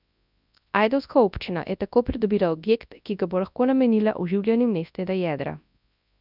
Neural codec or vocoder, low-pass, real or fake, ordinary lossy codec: codec, 24 kHz, 0.9 kbps, WavTokenizer, large speech release; 5.4 kHz; fake; none